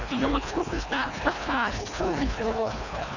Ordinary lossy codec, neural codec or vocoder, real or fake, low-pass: none; codec, 24 kHz, 1.5 kbps, HILCodec; fake; 7.2 kHz